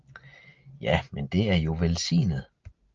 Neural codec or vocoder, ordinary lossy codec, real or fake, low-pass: none; Opus, 24 kbps; real; 7.2 kHz